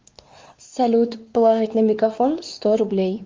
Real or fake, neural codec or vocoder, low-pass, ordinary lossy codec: fake; codec, 16 kHz, 2 kbps, X-Codec, WavLM features, trained on Multilingual LibriSpeech; 7.2 kHz; Opus, 32 kbps